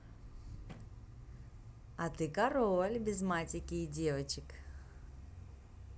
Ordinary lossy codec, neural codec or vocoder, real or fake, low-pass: none; none; real; none